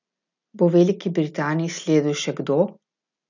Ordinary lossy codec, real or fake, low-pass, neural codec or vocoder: none; real; 7.2 kHz; none